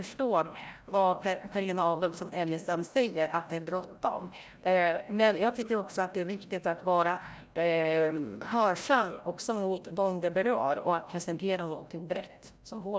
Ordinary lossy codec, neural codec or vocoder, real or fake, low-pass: none; codec, 16 kHz, 0.5 kbps, FreqCodec, larger model; fake; none